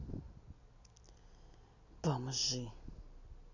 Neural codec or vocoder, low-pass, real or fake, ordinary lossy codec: none; 7.2 kHz; real; none